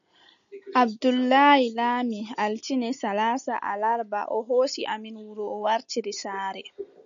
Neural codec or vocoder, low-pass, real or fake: none; 7.2 kHz; real